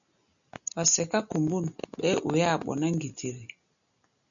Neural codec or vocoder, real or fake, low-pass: none; real; 7.2 kHz